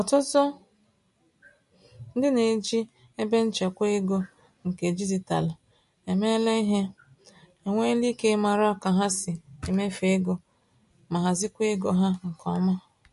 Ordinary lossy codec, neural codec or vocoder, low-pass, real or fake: MP3, 48 kbps; none; 14.4 kHz; real